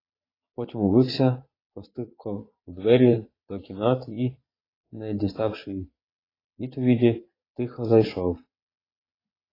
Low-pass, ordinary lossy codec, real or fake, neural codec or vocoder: 5.4 kHz; AAC, 24 kbps; fake; vocoder, 22.05 kHz, 80 mel bands, Vocos